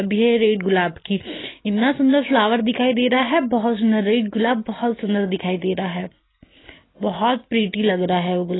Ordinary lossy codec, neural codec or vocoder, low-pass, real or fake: AAC, 16 kbps; none; 7.2 kHz; real